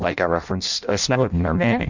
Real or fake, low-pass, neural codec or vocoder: fake; 7.2 kHz; codec, 16 kHz in and 24 kHz out, 0.6 kbps, FireRedTTS-2 codec